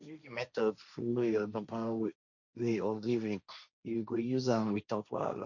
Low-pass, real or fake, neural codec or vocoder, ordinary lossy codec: 7.2 kHz; fake; codec, 16 kHz, 1.1 kbps, Voila-Tokenizer; none